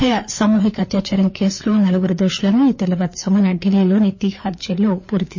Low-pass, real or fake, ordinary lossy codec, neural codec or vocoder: 7.2 kHz; fake; MP3, 32 kbps; codec, 16 kHz, 4 kbps, FreqCodec, larger model